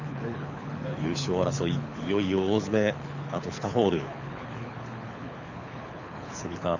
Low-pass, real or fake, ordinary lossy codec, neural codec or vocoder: 7.2 kHz; fake; none; codec, 24 kHz, 6 kbps, HILCodec